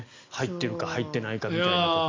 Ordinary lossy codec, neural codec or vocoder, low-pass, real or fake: MP3, 64 kbps; none; 7.2 kHz; real